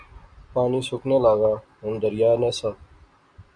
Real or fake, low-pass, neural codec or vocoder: real; 9.9 kHz; none